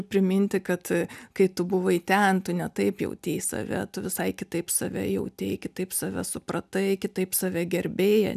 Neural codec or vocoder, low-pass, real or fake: none; 14.4 kHz; real